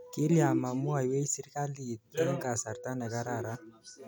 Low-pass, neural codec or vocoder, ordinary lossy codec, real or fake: none; none; none; real